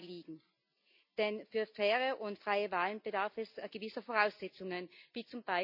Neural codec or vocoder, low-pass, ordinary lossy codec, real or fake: none; 5.4 kHz; none; real